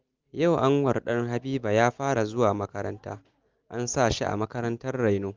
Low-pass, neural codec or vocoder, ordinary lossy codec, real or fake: 7.2 kHz; none; Opus, 32 kbps; real